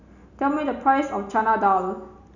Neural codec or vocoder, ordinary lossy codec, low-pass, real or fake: none; none; 7.2 kHz; real